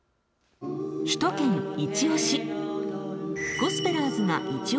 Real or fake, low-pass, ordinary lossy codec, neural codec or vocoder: real; none; none; none